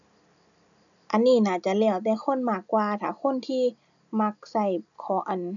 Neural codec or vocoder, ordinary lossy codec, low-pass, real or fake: none; none; 7.2 kHz; real